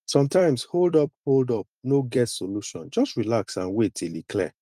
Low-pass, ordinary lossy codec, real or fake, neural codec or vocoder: 14.4 kHz; Opus, 32 kbps; fake; vocoder, 44.1 kHz, 128 mel bands every 256 samples, BigVGAN v2